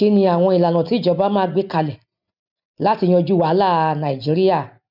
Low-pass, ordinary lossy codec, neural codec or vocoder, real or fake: 5.4 kHz; none; none; real